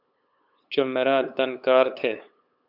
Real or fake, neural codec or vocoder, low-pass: fake; codec, 16 kHz, 8 kbps, FunCodec, trained on LibriTTS, 25 frames a second; 5.4 kHz